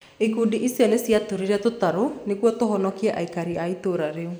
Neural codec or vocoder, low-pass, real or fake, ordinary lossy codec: none; none; real; none